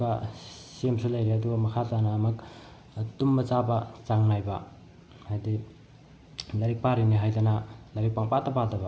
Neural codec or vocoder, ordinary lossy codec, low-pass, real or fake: none; none; none; real